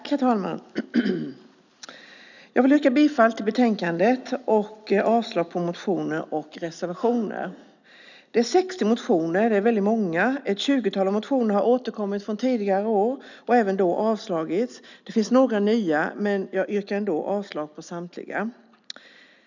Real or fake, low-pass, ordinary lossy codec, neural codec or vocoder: real; 7.2 kHz; none; none